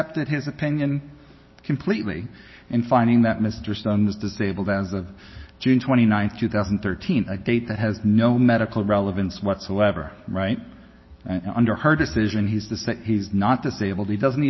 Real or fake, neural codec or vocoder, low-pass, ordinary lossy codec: real; none; 7.2 kHz; MP3, 24 kbps